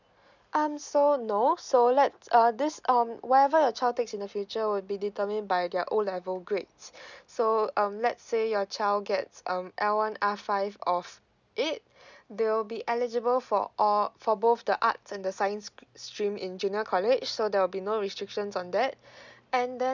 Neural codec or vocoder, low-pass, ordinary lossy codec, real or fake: none; 7.2 kHz; none; real